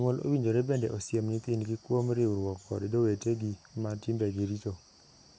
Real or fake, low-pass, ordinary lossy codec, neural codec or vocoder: real; none; none; none